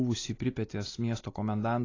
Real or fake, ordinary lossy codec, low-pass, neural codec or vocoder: real; AAC, 32 kbps; 7.2 kHz; none